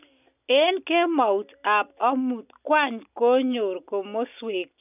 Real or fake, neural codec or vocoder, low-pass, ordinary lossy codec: real; none; 3.6 kHz; none